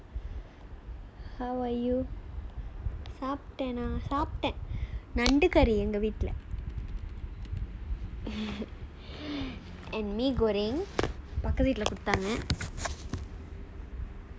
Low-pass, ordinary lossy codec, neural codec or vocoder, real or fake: none; none; none; real